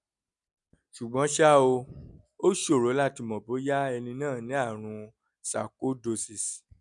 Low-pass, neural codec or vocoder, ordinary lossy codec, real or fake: 10.8 kHz; none; none; real